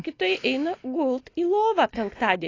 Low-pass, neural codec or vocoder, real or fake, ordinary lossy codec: 7.2 kHz; none; real; AAC, 32 kbps